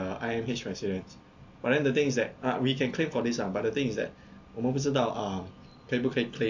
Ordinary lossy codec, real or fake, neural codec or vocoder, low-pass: AAC, 48 kbps; real; none; 7.2 kHz